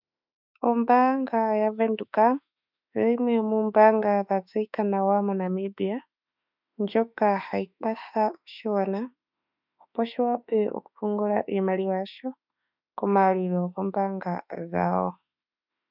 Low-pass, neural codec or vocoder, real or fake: 5.4 kHz; autoencoder, 48 kHz, 32 numbers a frame, DAC-VAE, trained on Japanese speech; fake